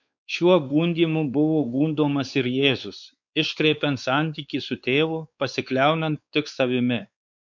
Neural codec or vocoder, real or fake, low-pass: codec, 16 kHz, 4 kbps, X-Codec, WavLM features, trained on Multilingual LibriSpeech; fake; 7.2 kHz